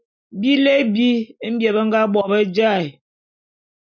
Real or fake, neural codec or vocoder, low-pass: real; none; 7.2 kHz